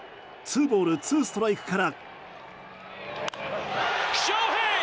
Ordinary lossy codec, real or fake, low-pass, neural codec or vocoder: none; real; none; none